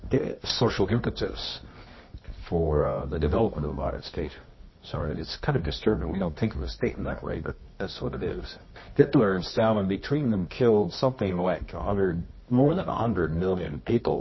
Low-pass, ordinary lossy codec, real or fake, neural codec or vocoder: 7.2 kHz; MP3, 24 kbps; fake; codec, 24 kHz, 0.9 kbps, WavTokenizer, medium music audio release